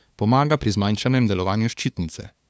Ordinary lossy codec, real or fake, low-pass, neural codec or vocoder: none; fake; none; codec, 16 kHz, 2 kbps, FunCodec, trained on LibriTTS, 25 frames a second